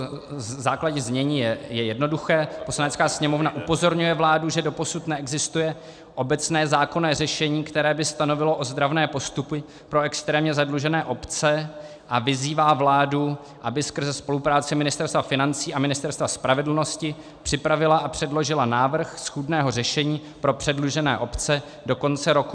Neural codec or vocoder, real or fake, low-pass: none; real; 9.9 kHz